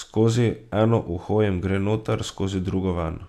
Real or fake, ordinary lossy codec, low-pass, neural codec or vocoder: real; none; 14.4 kHz; none